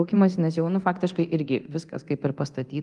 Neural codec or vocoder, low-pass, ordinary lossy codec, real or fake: codec, 24 kHz, 0.9 kbps, DualCodec; 10.8 kHz; Opus, 24 kbps; fake